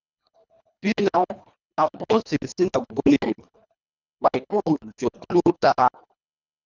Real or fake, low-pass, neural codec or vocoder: fake; 7.2 kHz; codec, 24 kHz, 1.5 kbps, HILCodec